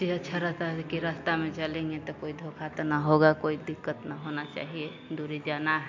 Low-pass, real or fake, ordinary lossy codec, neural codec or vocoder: 7.2 kHz; real; MP3, 48 kbps; none